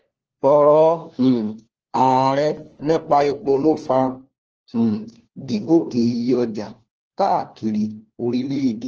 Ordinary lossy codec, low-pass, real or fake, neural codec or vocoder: Opus, 16 kbps; 7.2 kHz; fake; codec, 16 kHz, 1 kbps, FunCodec, trained on LibriTTS, 50 frames a second